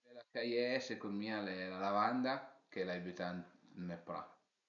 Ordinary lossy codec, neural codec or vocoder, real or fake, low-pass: none; none; real; 7.2 kHz